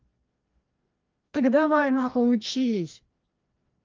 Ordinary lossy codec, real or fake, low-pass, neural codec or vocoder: Opus, 24 kbps; fake; 7.2 kHz; codec, 16 kHz, 1 kbps, FreqCodec, larger model